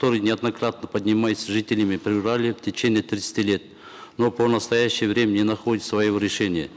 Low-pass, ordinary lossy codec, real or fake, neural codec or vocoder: none; none; real; none